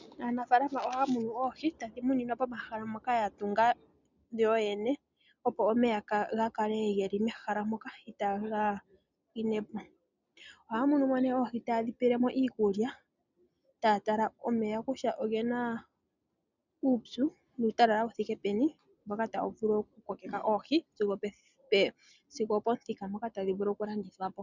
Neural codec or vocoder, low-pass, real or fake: none; 7.2 kHz; real